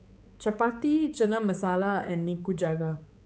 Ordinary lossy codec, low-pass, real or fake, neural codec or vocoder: none; none; fake; codec, 16 kHz, 4 kbps, X-Codec, HuBERT features, trained on balanced general audio